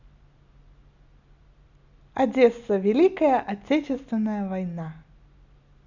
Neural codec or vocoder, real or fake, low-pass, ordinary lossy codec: none; real; 7.2 kHz; none